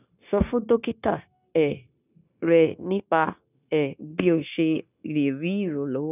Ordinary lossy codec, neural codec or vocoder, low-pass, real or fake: none; codec, 16 kHz, 0.9 kbps, LongCat-Audio-Codec; 3.6 kHz; fake